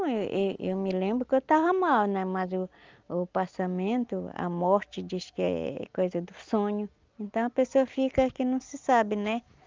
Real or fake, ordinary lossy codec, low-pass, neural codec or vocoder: real; Opus, 24 kbps; 7.2 kHz; none